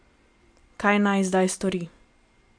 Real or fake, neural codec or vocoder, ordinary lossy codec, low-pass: fake; vocoder, 44.1 kHz, 128 mel bands every 256 samples, BigVGAN v2; MP3, 64 kbps; 9.9 kHz